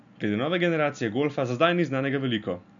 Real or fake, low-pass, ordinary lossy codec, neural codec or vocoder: real; 7.2 kHz; none; none